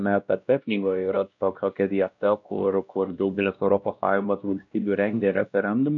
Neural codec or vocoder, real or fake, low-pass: codec, 16 kHz, 1 kbps, X-Codec, WavLM features, trained on Multilingual LibriSpeech; fake; 7.2 kHz